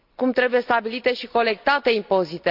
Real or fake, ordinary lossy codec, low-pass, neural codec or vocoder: real; none; 5.4 kHz; none